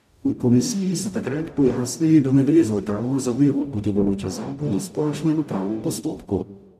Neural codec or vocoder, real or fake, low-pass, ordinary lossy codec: codec, 44.1 kHz, 0.9 kbps, DAC; fake; 14.4 kHz; none